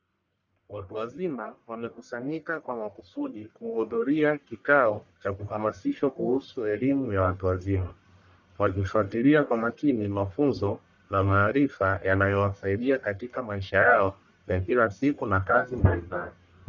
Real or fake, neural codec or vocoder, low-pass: fake; codec, 44.1 kHz, 1.7 kbps, Pupu-Codec; 7.2 kHz